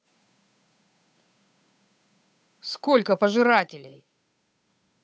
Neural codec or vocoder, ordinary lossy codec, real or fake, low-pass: codec, 16 kHz, 8 kbps, FunCodec, trained on Chinese and English, 25 frames a second; none; fake; none